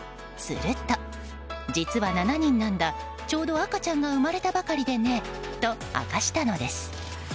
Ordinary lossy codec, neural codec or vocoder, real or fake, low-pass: none; none; real; none